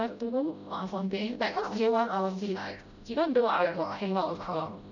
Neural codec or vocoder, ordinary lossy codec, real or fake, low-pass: codec, 16 kHz, 0.5 kbps, FreqCodec, smaller model; none; fake; 7.2 kHz